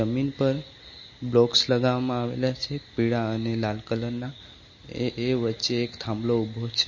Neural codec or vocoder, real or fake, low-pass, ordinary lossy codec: none; real; 7.2 kHz; MP3, 32 kbps